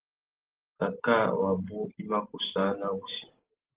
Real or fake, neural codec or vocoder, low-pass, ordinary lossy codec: real; none; 3.6 kHz; Opus, 16 kbps